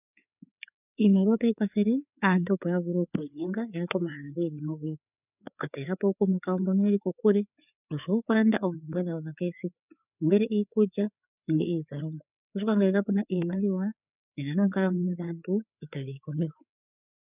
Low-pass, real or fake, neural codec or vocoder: 3.6 kHz; fake; codec, 16 kHz, 4 kbps, FreqCodec, larger model